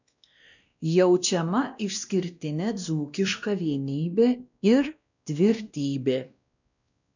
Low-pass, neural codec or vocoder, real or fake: 7.2 kHz; codec, 16 kHz, 1 kbps, X-Codec, WavLM features, trained on Multilingual LibriSpeech; fake